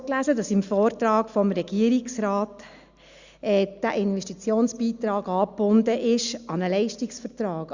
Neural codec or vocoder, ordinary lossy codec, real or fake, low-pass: none; Opus, 64 kbps; real; 7.2 kHz